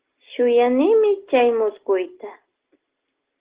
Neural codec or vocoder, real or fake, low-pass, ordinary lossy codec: none; real; 3.6 kHz; Opus, 16 kbps